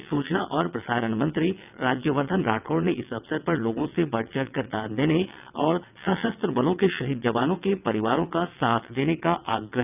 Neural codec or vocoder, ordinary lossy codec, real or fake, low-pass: vocoder, 22.05 kHz, 80 mel bands, WaveNeXt; none; fake; 3.6 kHz